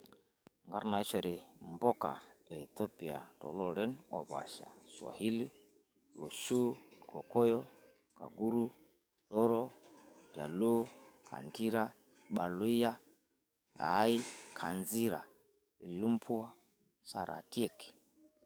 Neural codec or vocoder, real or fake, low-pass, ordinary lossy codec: codec, 44.1 kHz, 7.8 kbps, DAC; fake; none; none